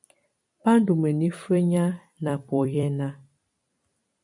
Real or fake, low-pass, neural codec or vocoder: fake; 10.8 kHz; vocoder, 44.1 kHz, 128 mel bands every 256 samples, BigVGAN v2